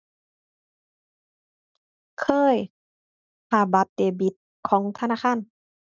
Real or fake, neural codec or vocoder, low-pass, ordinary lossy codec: real; none; 7.2 kHz; none